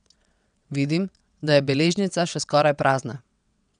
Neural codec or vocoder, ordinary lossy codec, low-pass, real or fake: vocoder, 22.05 kHz, 80 mel bands, WaveNeXt; none; 9.9 kHz; fake